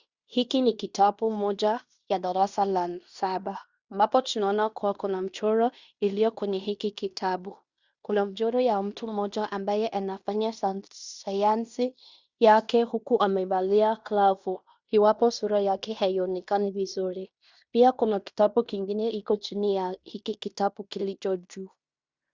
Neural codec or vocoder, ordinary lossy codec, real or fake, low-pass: codec, 16 kHz in and 24 kHz out, 0.9 kbps, LongCat-Audio-Codec, fine tuned four codebook decoder; Opus, 64 kbps; fake; 7.2 kHz